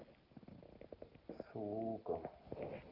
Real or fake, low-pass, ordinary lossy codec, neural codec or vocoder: real; 5.4 kHz; Opus, 24 kbps; none